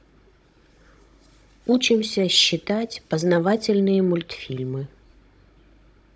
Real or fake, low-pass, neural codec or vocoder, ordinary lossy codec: fake; none; codec, 16 kHz, 16 kbps, FunCodec, trained on Chinese and English, 50 frames a second; none